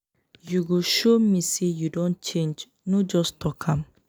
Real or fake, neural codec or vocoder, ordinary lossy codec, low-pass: real; none; none; none